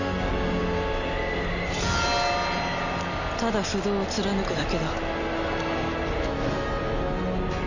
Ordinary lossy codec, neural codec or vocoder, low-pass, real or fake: none; none; 7.2 kHz; real